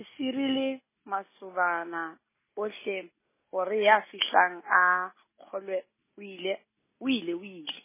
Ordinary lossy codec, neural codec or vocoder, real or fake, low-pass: MP3, 16 kbps; none; real; 3.6 kHz